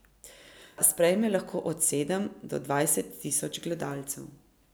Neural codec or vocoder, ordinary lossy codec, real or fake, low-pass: none; none; real; none